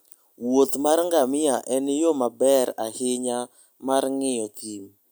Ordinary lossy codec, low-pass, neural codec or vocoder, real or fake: none; none; none; real